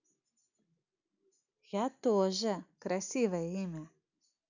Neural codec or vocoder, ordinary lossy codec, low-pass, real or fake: none; none; 7.2 kHz; real